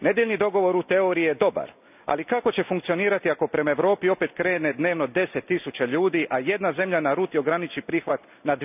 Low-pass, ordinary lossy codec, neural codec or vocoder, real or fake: 3.6 kHz; none; none; real